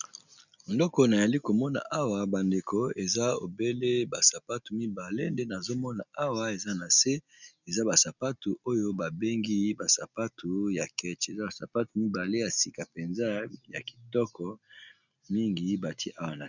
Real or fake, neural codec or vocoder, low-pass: real; none; 7.2 kHz